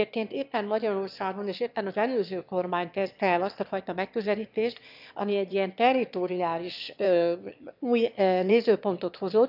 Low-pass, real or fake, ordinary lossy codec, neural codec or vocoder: 5.4 kHz; fake; none; autoencoder, 22.05 kHz, a latent of 192 numbers a frame, VITS, trained on one speaker